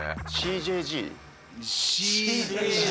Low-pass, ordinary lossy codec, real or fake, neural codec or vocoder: none; none; real; none